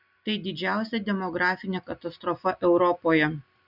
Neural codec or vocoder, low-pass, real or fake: none; 5.4 kHz; real